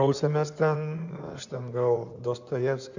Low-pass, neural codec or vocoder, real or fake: 7.2 kHz; codec, 16 kHz in and 24 kHz out, 2.2 kbps, FireRedTTS-2 codec; fake